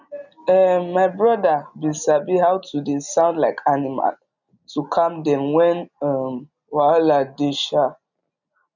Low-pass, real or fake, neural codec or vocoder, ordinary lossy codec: 7.2 kHz; real; none; none